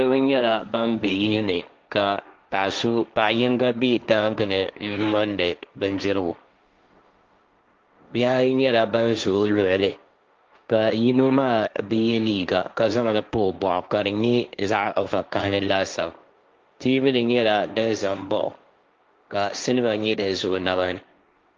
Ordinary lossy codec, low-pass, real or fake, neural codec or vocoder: Opus, 24 kbps; 7.2 kHz; fake; codec, 16 kHz, 1.1 kbps, Voila-Tokenizer